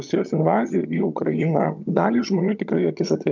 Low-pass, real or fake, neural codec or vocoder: 7.2 kHz; fake; vocoder, 22.05 kHz, 80 mel bands, HiFi-GAN